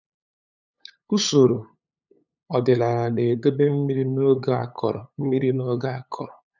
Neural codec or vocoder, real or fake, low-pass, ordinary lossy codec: codec, 16 kHz, 8 kbps, FunCodec, trained on LibriTTS, 25 frames a second; fake; 7.2 kHz; none